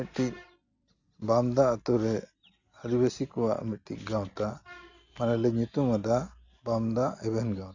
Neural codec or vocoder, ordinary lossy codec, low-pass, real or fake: none; none; 7.2 kHz; real